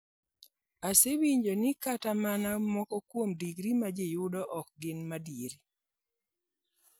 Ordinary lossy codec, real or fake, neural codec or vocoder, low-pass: none; real; none; none